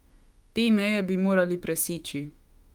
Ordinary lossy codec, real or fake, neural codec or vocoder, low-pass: Opus, 32 kbps; fake; autoencoder, 48 kHz, 32 numbers a frame, DAC-VAE, trained on Japanese speech; 19.8 kHz